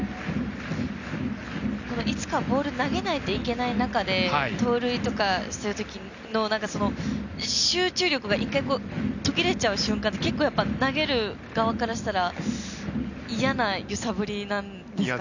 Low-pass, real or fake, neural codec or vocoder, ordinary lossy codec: 7.2 kHz; real; none; none